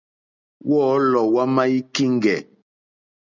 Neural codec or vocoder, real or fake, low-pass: none; real; 7.2 kHz